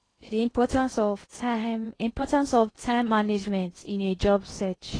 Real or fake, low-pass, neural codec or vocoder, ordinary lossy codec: fake; 9.9 kHz; codec, 16 kHz in and 24 kHz out, 0.6 kbps, FocalCodec, streaming, 2048 codes; AAC, 32 kbps